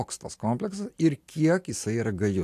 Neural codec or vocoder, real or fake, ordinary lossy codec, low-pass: none; real; AAC, 64 kbps; 14.4 kHz